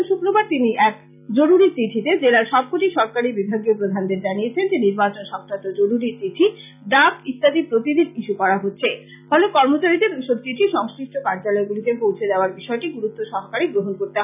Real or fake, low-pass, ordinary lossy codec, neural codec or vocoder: real; 3.6 kHz; none; none